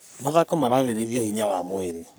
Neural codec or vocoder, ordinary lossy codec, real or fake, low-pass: codec, 44.1 kHz, 3.4 kbps, Pupu-Codec; none; fake; none